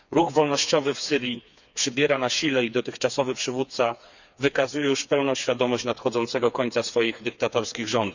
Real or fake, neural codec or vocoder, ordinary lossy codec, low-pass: fake; codec, 16 kHz, 4 kbps, FreqCodec, smaller model; none; 7.2 kHz